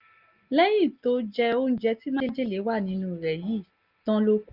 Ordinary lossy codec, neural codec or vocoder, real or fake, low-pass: Opus, 24 kbps; none; real; 5.4 kHz